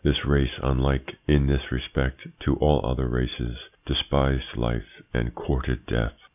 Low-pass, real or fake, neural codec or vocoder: 3.6 kHz; real; none